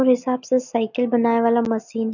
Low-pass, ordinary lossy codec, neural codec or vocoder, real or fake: 7.2 kHz; none; none; real